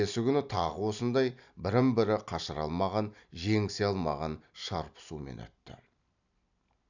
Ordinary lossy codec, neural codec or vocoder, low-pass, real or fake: none; none; 7.2 kHz; real